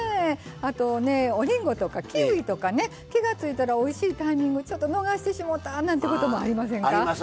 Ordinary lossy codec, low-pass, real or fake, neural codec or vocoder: none; none; real; none